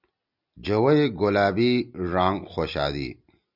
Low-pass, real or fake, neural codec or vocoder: 5.4 kHz; real; none